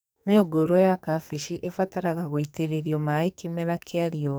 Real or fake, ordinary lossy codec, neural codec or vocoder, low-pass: fake; none; codec, 44.1 kHz, 2.6 kbps, SNAC; none